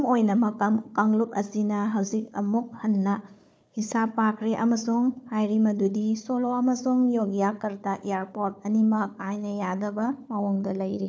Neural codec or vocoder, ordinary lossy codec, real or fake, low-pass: codec, 16 kHz, 4 kbps, FunCodec, trained on Chinese and English, 50 frames a second; none; fake; none